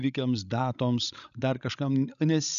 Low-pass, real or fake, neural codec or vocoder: 7.2 kHz; fake; codec, 16 kHz, 16 kbps, FreqCodec, larger model